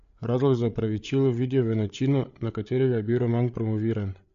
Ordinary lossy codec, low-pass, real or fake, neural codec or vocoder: MP3, 48 kbps; 7.2 kHz; fake; codec, 16 kHz, 8 kbps, FreqCodec, larger model